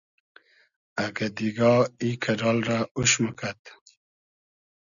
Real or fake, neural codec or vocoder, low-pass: real; none; 7.2 kHz